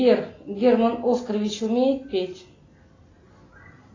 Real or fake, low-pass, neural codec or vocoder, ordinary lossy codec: real; 7.2 kHz; none; AAC, 32 kbps